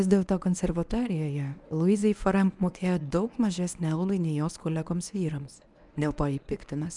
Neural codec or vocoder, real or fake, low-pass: codec, 24 kHz, 0.9 kbps, WavTokenizer, medium speech release version 1; fake; 10.8 kHz